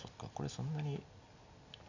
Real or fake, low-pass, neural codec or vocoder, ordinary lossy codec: real; 7.2 kHz; none; none